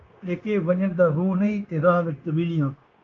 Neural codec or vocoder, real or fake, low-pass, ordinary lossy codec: codec, 16 kHz, 0.9 kbps, LongCat-Audio-Codec; fake; 7.2 kHz; Opus, 24 kbps